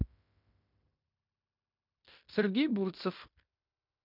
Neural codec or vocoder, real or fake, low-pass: codec, 16 kHz in and 24 kHz out, 0.9 kbps, LongCat-Audio-Codec, fine tuned four codebook decoder; fake; 5.4 kHz